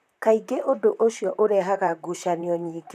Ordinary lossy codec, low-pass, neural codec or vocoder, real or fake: none; 14.4 kHz; vocoder, 44.1 kHz, 128 mel bands, Pupu-Vocoder; fake